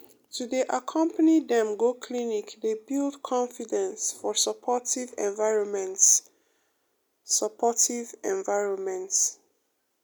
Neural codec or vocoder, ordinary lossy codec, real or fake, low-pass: none; none; real; none